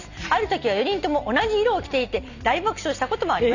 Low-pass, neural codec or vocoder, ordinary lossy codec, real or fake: 7.2 kHz; none; none; real